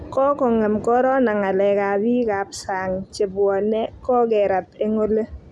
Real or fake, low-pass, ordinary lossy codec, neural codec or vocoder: real; none; none; none